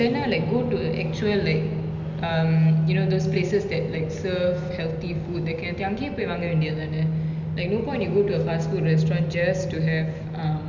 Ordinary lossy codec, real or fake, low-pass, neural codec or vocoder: none; real; 7.2 kHz; none